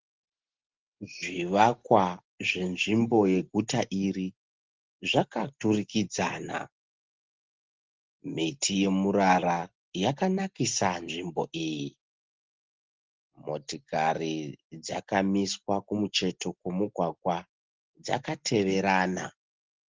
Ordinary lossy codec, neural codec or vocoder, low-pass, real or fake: Opus, 16 kbps; none; 7.2 kHz; real